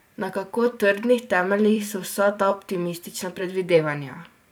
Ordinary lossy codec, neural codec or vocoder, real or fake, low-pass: none; vocoder, 44.1 kHz, 128 mel bands every 512 samples, BigVGAN v2; fake; none